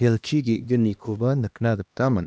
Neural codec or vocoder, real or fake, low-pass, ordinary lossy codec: codec, 16 kHz, 1 kbps, X-Codec, HuBERT features, trained on LibriSpeech; fake; none; none